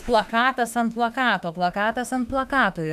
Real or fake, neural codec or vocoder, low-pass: fake; autoencoder, 48 kHz, 32 numbers a frame, DAC-VAE, trained on Japanese speech; 14.4 kHz